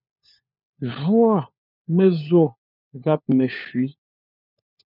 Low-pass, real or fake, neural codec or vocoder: 5.4 kHz; fake; codec, 16 kHz, 4 kbps, FunCodec, trained on LibriTTS, 50 frames a second